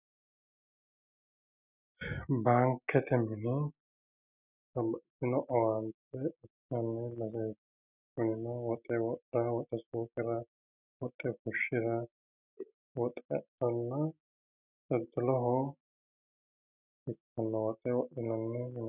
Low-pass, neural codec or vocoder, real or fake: 3.6 kHz; none; real